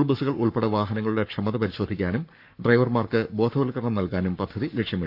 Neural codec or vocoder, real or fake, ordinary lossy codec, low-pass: codec, 44.1 kHz, 7.8 kbps, Pupu-Codec; fake; none; 5.4 kHz